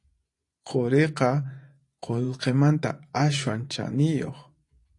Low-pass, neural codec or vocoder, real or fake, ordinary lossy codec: 10.8 kHz; vocoder, 24 kHz, 100 mel bands, Vocos; fake; AAC, 48 kbps